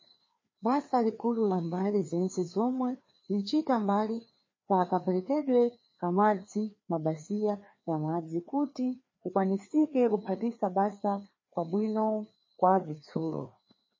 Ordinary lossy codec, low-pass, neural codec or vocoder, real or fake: MP3, 32 kbps; 7.2 kHz; codec, 16 kHz, 2 kbps, FreqCodec, larger model; fake